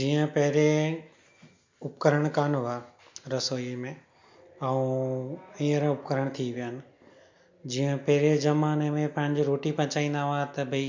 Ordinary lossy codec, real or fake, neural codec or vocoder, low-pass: MP3, 48 kbps; real; none; 7.2 kHz